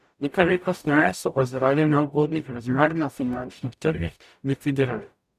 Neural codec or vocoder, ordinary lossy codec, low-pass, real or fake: codec, 44.1 kHz, 0.9 kbps, DAC; none; 14.4 kHz; fake